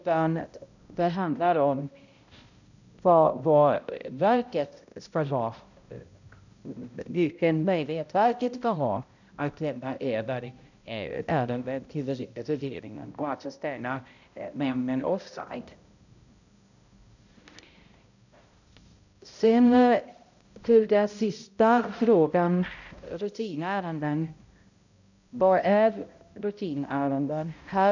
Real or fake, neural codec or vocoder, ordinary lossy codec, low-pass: fake; codec, 16 kHz, 0.5 kbps, X-Codec, HuBERT features, trained on balanced general audio; none; 7.2 kHz